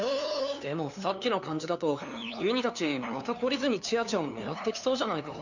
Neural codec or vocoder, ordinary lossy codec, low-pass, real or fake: codec, 16 kHz, 2 kbps, FunCodec, trained on LibriTTS, 25 frames a second; none; 7.2 kHz; fake